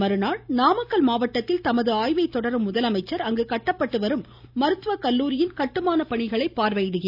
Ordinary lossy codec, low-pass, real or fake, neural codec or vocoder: none; 5.4 kHz; real; none